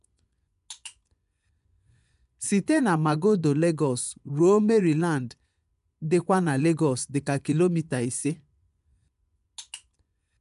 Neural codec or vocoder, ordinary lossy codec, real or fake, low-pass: vocoder, 24 kHz, 100 mel bands, Vocos; none; fake; 10.8 kHz